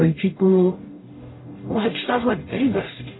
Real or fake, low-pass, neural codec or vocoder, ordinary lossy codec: fake; 7.2 kHz; codec, 44.1 kHz, 0.9 kbps, DAC; AAC, 16 kbps